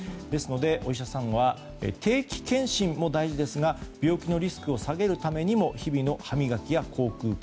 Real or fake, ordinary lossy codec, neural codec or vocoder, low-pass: real; none; none; none